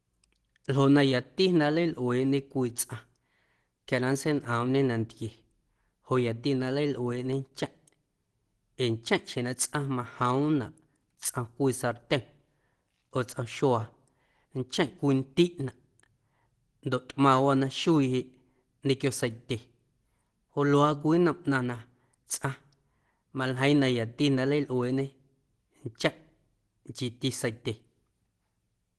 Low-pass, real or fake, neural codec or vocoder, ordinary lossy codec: 10.8 kHz; real; none; Opus, 16 kbps